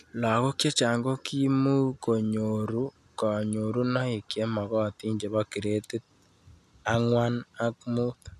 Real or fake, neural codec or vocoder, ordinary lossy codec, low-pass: real; none; none; 14.4 kHz